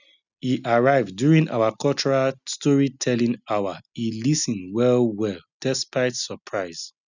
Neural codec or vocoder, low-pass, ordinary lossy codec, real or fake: none; 7.2 kHz; none; real